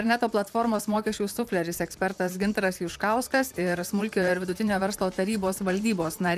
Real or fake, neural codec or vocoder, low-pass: fake; vocoder, 44.1 kHz, 128 mel bands, Pupu-Vocoder; 14.4 kHz